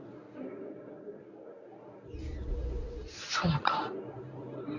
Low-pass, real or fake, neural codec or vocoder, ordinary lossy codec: 7.2 kHz; fake; codec, 24 kHz, 0.9 kbps, WavTokenizer, medium speech release version 1; none